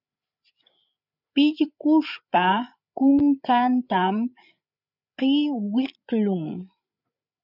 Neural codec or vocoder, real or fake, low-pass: codec, 16 kHz, 8 kbps, FreqCodec, larger model; fake; 5.4 kHz